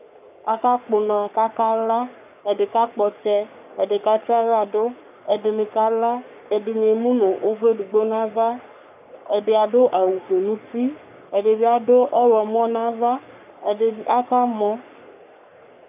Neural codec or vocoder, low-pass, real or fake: codec, 44.1 kHz, 3.4 kbps, Pupu-Codec; 3.6 kHz; fake